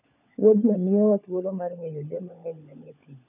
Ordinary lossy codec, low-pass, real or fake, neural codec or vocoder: none; 3.6 kHz; fake; codec, 16 kHz, 16 kbps, FunCodec, trained on LibriTTS, 50 frames a second